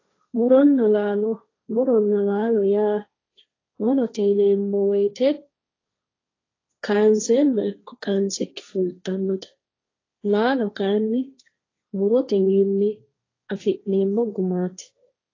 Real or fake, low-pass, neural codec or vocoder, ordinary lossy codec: fake; 7.2 kHz; codec, 16 kHz, 1.1 kbps, Voila-Tokenizer; AAC, 32 kbps